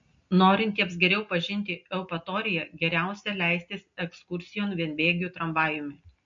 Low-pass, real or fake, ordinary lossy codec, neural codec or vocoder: 7.2 kHz; real; MP3, 48 kbps; none